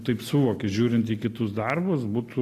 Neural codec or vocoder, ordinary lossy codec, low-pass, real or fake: none; AAC, 48 kbps; 14.4 kHz; real